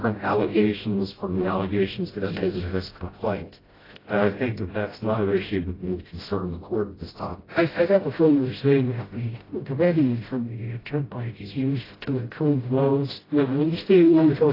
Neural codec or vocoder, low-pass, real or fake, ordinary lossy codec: codec, 16 kHz, 0.5 kbps, FreqCodec, smaller model; 5.4 kHz; fake; AAC, 24 kbps